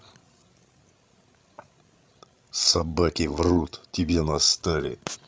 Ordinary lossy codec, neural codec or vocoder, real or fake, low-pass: none; codec, 16 kHz, 16 kbps, FreqCodec, larger model; fake; none